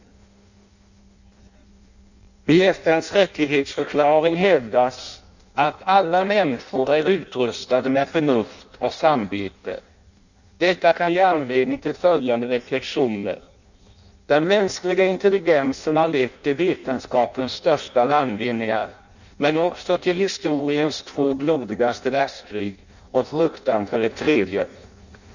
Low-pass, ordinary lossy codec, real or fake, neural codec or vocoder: 7.2 kHz; none; fake; codec, 16 kHz in and 24 kHz out, 0.6 kbps, FireRedTTS-2 codec